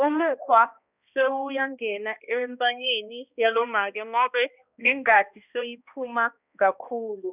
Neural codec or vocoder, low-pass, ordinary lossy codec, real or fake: codec, 16 kHz, 1 kbps, X-Codec, HuBERT features, trained on balanced general audio; 3.6 kHz; none; fake